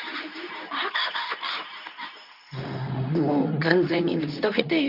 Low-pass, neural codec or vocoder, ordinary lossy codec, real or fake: 5.4 kHz; codec, 24 kHz, 0.9 kbps, WavTokenizer, medium speech release version 2; none; fake